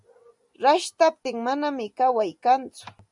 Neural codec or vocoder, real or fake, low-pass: none; real; 10.8 kHz